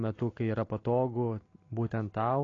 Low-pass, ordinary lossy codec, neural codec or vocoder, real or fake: 7.2 kHz; AAC, 32 kbps; none; real